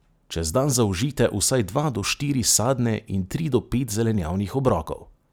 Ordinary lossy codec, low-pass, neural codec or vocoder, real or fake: none; none; none; real